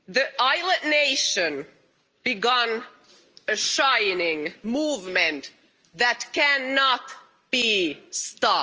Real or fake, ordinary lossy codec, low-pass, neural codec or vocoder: real; Opus, 24 kbps; 7.2 kHz; none